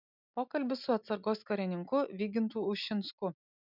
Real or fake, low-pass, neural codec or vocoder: real; 5.4 kHz; none